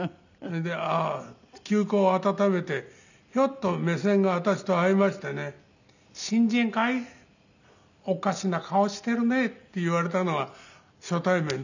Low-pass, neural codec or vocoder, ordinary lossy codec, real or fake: 7.2 kHz; none; none; real